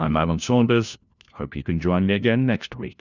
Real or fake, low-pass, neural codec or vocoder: fake; 7.2 kHz; codec, 16 kHz, 1 kbps, FunCodec, trained on LibriTTS, 50 frames a second